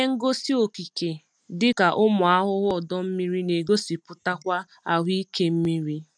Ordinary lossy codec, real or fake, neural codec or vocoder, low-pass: none; real; none; 9.9 kHz